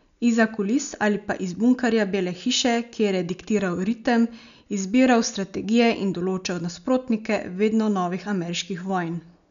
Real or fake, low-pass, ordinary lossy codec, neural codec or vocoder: real; 7.2 kHz; none; none